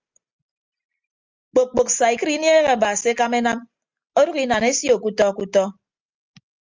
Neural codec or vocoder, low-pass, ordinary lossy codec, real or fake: none; 7.2 kHz; Opus, 32 kbps; real